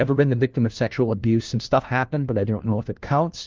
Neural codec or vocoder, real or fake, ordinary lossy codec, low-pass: codec, 16 kHz, 1 kbps, FunCodec, trained on LibriTTS, 50 frames a second; fake; Opus, 32 kbps; 7.2 kHz